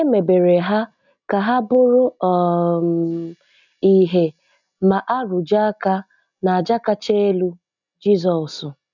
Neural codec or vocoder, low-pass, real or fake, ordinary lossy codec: none; 7.2 kHz; real; none